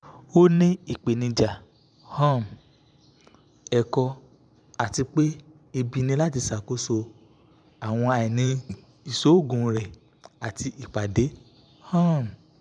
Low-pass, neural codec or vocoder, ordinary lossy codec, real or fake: 9.9 kHz; vocoder, 44.1 kHz, 128 mel bands every 512 samples, BigVGAN v2; none; fake